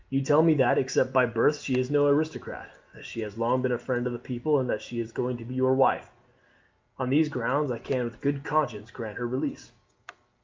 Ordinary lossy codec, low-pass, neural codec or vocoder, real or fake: Opus, 24 kbps; 7.2 kHz; none; real